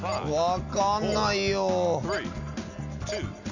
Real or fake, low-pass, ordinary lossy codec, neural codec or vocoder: real; 7.2 kHz; none; none